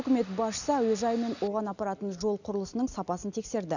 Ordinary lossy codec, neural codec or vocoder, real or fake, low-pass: none; none; real; 7.2 kHz